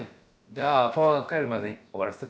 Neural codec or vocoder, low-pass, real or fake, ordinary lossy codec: codec, 16 kHz, about 1 kbps, DyCAST, with the encoder's durations; none; fake; none